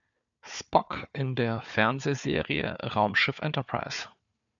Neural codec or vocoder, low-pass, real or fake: codec, 16 kHz, 4 kbps, FunCodec, trained on Chinese and English, 50 frames a second; 7.2 kHz; fake